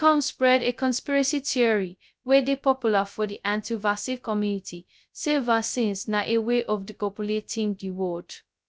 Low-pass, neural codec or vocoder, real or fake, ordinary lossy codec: none; codec, 16 kHz, 0.2 kbps, FocalCodec; fake; none